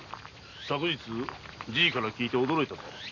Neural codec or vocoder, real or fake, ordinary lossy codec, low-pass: none; real; none; 7.2 kHz